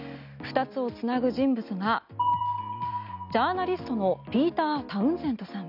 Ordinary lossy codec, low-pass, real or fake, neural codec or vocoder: none; 5.4 kHz; real; none